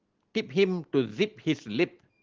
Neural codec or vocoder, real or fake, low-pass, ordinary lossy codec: none; real; 7.2 kHz; Opus, 16 kbps